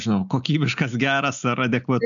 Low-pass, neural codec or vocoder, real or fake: 7.2 kHz; none; real